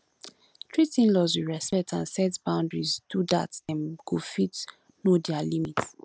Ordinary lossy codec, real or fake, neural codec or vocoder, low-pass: none; real; none; none